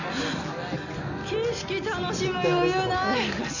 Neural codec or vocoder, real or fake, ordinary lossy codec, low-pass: none; real; none; 7.2 kHz